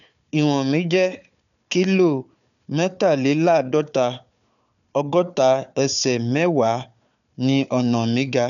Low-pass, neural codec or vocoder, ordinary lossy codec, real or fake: 7.2 kHz; codec, 16 kHz, 4 kbps, FunCodec, trained on Chinese and English, 50 frames a second; none; fake